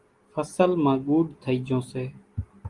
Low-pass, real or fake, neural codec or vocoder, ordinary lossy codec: 10.8 kHz; real; none; Opus, 24 kbps